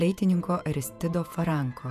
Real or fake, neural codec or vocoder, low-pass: fake; vocoder, 48 kHz, 128 mel bands, Vocos; 14.4 kHz